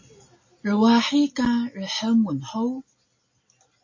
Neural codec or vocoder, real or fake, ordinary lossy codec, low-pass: none; real; MP3, 32 kbps; 7.2 kHz